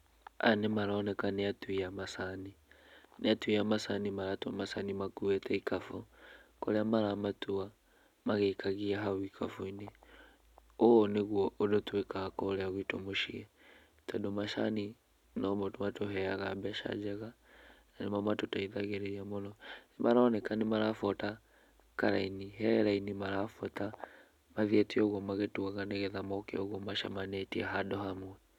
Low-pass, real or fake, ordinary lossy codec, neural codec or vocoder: 19.8 kHz; real; none; none